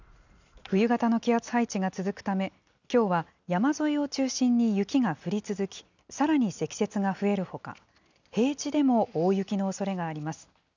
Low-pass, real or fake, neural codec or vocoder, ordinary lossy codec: 7.2 kHz; real; none; none